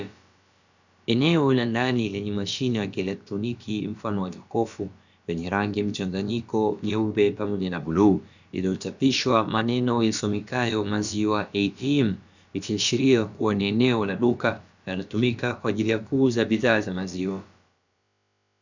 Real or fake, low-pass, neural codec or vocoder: fake; 7.2 kHz; codec, 16 kHz, about 1 kbps, DyCAST, with the encoder's durations